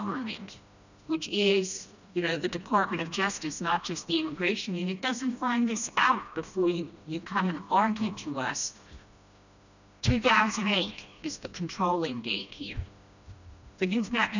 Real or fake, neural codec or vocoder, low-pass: fake; codec, 16 kHz, 1 kbps, FreqCodec, smaller model; 7.2 kHz